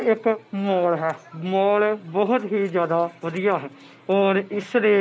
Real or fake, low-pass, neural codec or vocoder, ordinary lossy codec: real; none; none; none